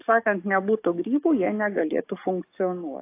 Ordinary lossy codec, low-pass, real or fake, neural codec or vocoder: AAC, 24 kbps; 3.6 kHz; fake; codec, 44.1 kHz, 7.8 kbps, DAC